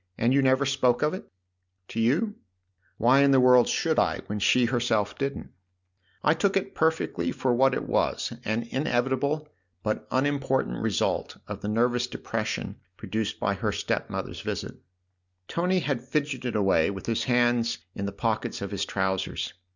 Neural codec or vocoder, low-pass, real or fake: none; 7.2 kHz; real